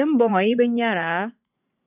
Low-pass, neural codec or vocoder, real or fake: 3.6 kHz; vocoder, 44.1 kHz, 80 mel bands, Vocos; fake